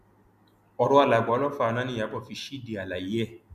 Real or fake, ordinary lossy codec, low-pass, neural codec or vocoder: real; none; 14.4 kHz; none